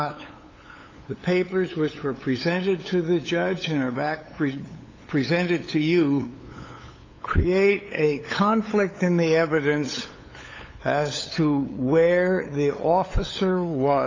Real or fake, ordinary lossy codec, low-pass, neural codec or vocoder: fake; AAC, 32 kbps; 7.2 kHz; codec, 16 kHz, 8 kbps, FunCodec, trained on LibriTTS, 25 frames a second